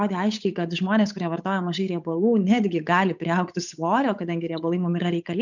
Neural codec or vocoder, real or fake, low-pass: codec, 16 kHz, 8 kbps, FunCodec, trained on Chinese and English, 25 frames a second; fake; 7.2 kHz